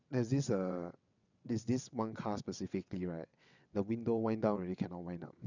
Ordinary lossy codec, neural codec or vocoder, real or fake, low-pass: none; vocoder, 22.05 kHz, 80 mel bands, WaveNeXt; fake; 7.2 kHz